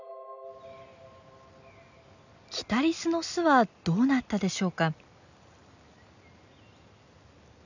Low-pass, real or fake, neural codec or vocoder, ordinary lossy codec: 7.2 kHz; real; none; none